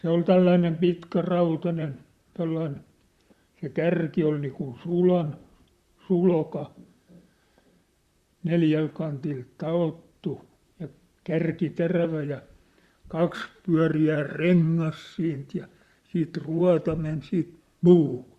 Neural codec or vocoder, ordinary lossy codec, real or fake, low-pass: vocoder, 44.1 kHz, 128 mel bands, Pupu-Vocoder; Opus, 64 kbps; fake; 14.4 kHz